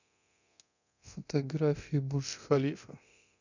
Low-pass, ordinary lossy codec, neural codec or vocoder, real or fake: 7.2 kHz; none; codec, 24 kHz, 0.9 kbps, DualCodec; fake